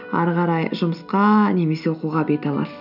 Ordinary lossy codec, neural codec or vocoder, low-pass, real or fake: none; none; 5.4 kHz; real